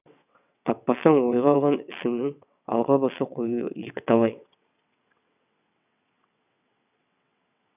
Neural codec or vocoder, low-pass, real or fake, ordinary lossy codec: vocoder, 22.05 kHz, 80 mel bands, WaveNeXt; 3.6 kHz; fake; none